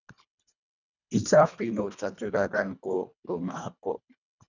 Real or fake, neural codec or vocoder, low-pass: fake; codec, 24 kHz, 1.5 kbps, HILCodec; 7.2 kHz